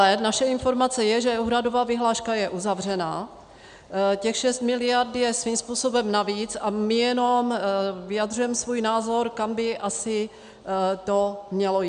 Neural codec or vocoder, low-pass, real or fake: none; 9.9 kHz; real